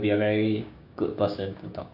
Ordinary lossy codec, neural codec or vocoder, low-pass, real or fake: none; codec, 16 kHz, 6 kbps, DAC; 5.4 kHz; fake